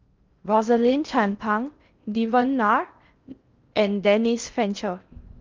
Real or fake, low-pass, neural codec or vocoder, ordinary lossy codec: fake; 7.2 kHz; codec, 16 kHz in and 24 kHz out, 0.6 kbps, FocalCodec, streaming, 4096 codes; Opus, 24 kbps